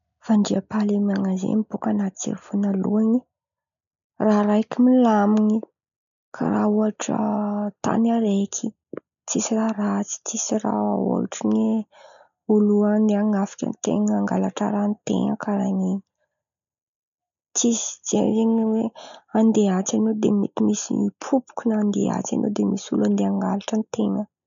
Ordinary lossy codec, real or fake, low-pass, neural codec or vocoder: MP3, 96 kbps; real; 7.2 kHz; none